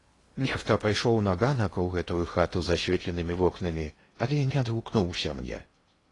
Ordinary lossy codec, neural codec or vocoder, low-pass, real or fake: AAC, 32 kbps; codec, 16 kHz in and 24 kHz out, 0.8 kbps, FocalCodec, streaming, 65536 codes; 10.8 kHz; fake